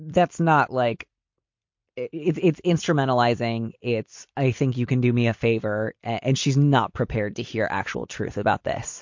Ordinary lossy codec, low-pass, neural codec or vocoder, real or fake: MP3, 48 kbps; 7.2 kHz; vocoder, 44.1 kHz, 128 mel bands every 256 samples, BigVGAN v2; fake